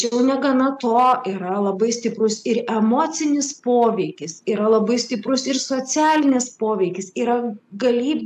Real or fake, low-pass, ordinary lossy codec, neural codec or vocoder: real; 14.4 kHz; AAC, 96 kbps; none